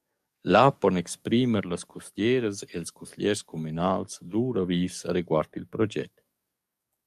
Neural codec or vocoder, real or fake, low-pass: codec, 44.1 kHz, 7.8 kbps, DAC; fake; 14.4 kHz